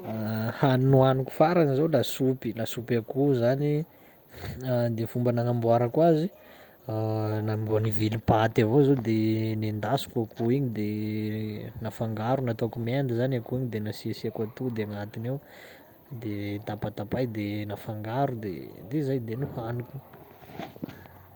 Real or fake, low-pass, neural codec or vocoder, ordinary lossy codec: real; 19.8 kHz; none; Opus, 32 kbps